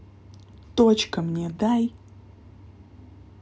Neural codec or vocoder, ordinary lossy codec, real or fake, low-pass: none; none; real; none